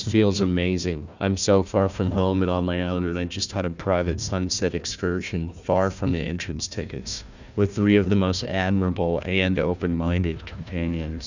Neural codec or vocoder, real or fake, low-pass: codec, 16 kHz, 1 kbps, FunCodec, trained on Chinese and English, 50 frames a second; fake; 7.2 kHz